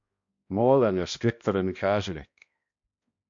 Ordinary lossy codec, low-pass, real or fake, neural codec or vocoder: MP3, 48 kbps; 7.2 kHz; fake; codec, 16 kHz, 1 kbps, X-Codec, HuBERT features, trained on balanced general audio